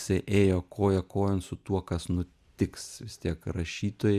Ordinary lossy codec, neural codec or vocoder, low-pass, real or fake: Opus, 64 kbps; none; 14.4 kHz; real